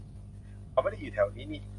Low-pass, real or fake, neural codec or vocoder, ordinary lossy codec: 10.8 kHz; real; none; AAC, 64 kbps